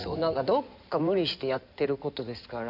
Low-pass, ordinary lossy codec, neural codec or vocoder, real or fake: 5.4 kHz; none; codec, 16 kHz in and 24 kHz out, 2.2 kbps, FireRedTTS-2 codec; fake